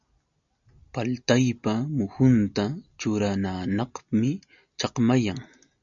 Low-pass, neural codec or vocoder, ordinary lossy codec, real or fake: 7.2 kHz; none; MP3, 64 kbps; real